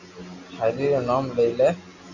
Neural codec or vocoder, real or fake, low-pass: none; real; 7.2 kHz